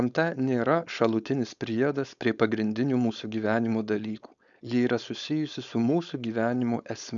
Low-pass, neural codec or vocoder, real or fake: 7.2 kHz; codec, 16 kHz, 4.8 kbps, FACodec; fake